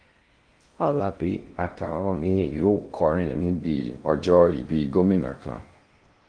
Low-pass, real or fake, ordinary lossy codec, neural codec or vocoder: 9.9 kHz; fake; Opus, 24 kbps; codec, 16 kHz in and 24 kHz out, 0.6 kbps, FocalCodec, streaming, 2048 codes